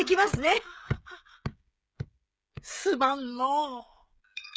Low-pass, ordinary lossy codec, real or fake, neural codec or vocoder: none; none; fake; codec, 16 kHz, 16 kbps, FreqCodec, smaller model